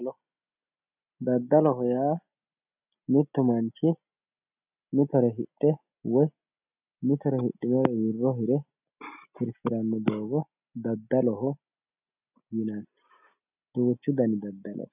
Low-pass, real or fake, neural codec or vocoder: 3.6 kHz; real; none